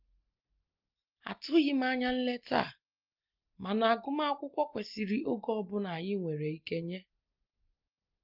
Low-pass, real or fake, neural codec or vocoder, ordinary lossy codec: 5.4 kHz; real; none; Opus, 24 kbps